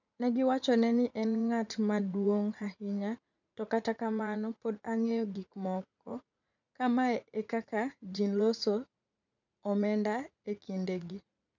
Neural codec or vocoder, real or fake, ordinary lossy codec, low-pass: vocoder, 44.1 kHz, 80 mel bands, Vocos; fake; none; 7.2 kHz